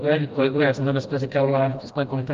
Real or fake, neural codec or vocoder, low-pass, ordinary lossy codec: fake; codec, 16 kHz, 1 kbps, FreqCodec, smaller model; 7.2 kHz; Opus, 32 kbps